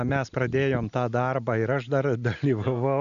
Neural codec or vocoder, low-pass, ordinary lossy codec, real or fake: none; 7.2 kHz; MP3, 96 kbps; real